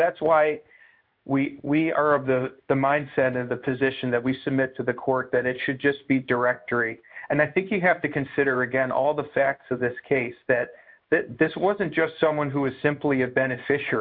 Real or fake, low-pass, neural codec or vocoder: fake; 5.4 kHz; codec, 16 kHz in and 24 kHz out, 1 kbps, XY-Tokenizer